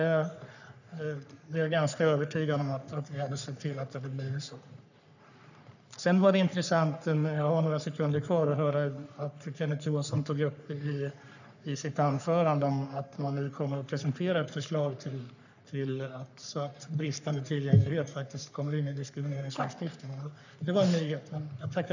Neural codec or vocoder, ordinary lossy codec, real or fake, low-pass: codec, 44.1 kHz, 3.4 kbps, Pupu-Codec; none; fake; 7.2 kHz